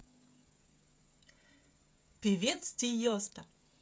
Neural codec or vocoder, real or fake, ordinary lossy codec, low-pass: codec, 16 kHz, 16 kbps, FreqCodec, smaller model; fake; none; none